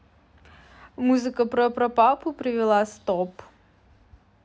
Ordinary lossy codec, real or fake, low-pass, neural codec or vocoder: none; real; none; none